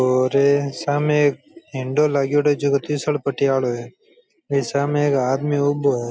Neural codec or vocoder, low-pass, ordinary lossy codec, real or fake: none; none; none; real